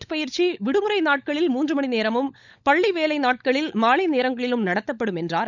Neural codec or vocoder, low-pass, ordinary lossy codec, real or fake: codec, 16 kHz, 16 kbps, FunCodec, trained on LibriTTS, 50 frames a second; 7.2 kHz; none; fake